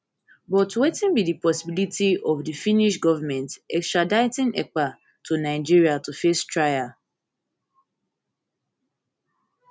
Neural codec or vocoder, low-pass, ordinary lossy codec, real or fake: none; none; none; real